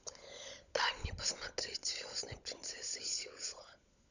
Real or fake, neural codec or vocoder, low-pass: fake; codec, 16 kHz, 16 kbps, FunCodec, trained on LibriTTS, 50 frames a second; 7.2 kHz